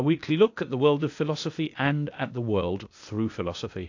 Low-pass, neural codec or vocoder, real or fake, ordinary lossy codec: 7.2 kHz; codec, 16 kHz, 0.8 kbps, ZipCodec; fake; AAC, 48 kbps